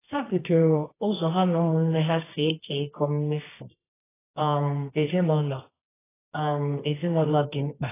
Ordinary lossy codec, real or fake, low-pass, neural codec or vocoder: AAC, 16 kbps; fake; 3.6 kHz; codec, 24 kHz, 0.9 kbps, WavTokenizer, medium music audio release